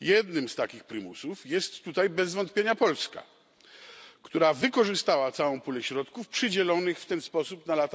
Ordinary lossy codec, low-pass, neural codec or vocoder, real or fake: none; none; none; real